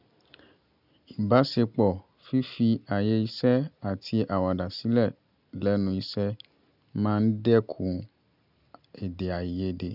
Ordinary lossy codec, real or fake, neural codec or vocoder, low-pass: none; real; none; 5.4 kHz